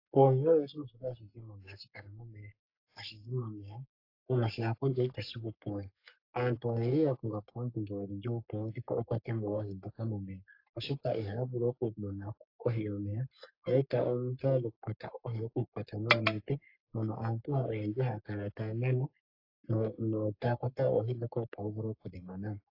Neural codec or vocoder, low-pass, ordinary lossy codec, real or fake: codec, 44.1 kHz, 3.4 kbps, Pupu-Codec; 5.4 kHz; AAC, 32 kbps; fake